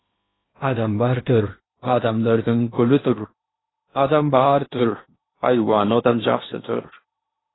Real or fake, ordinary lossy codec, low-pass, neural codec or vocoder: fake; AAC, 16 kbps; 7.2 kHz; codec, 16 kHz in and 24 kHz out, 0.8 kbps, FocalCodec, streaming, 65536 codes